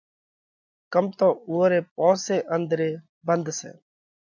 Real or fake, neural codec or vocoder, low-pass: real; none; 7.2 kHz